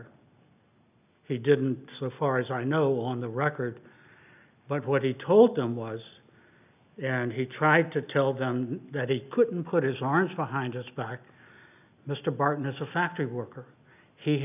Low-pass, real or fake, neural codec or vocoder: 3.6 kHz; real; none